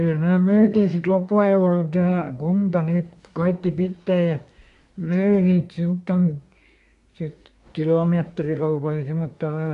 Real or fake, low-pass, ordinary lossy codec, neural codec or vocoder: fake; 10.8 kHz; MP3, 96 kbps; codec, 24 kHz, 1 kbps, SNAC